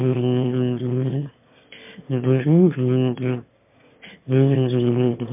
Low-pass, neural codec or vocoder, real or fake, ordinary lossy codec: 3.6 kHz; autoencoder, 22.05 kHz, a latent of 192 numbers a frame, VITS, trained on one speaker; fake; MP3, 32 kbps